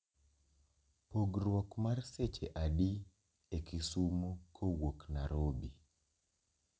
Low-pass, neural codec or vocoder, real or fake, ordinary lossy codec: none; none; real; none